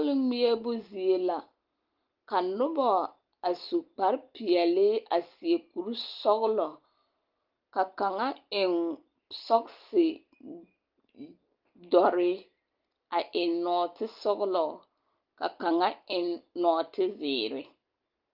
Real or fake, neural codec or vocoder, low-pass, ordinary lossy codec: real; none; 5.4 kHz; Opus, 32 kbps